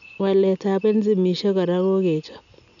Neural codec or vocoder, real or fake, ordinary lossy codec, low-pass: none; real; none; 7.2 kHz